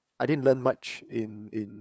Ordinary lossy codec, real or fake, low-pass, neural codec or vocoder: none; fake; none; codec, 16 kHz, 8 kbps, FunCodec, trained on LibriTTS, 25 frames a second